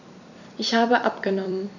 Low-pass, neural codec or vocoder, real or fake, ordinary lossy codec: 7.2 kHz; vocoder, 44.1 kHz, 128 mel bands every 256 samples, BigVGAN v2; fake; none